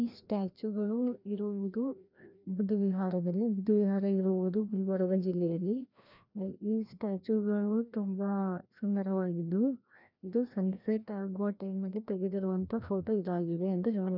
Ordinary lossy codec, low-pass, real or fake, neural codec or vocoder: none; 5.4 kHz; fake; codec, 16 kHz, 1 kbps, FreqCodec, larger model